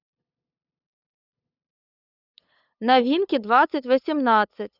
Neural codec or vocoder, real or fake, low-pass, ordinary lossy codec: codec, 16 kHz, 8 kbps, FunCodec, trained on LibriTTS, 25 frames a second; fake; 5.4 kHz; none